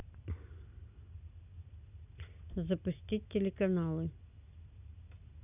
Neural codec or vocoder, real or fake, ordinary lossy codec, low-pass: none; real; none; 3.6 kHz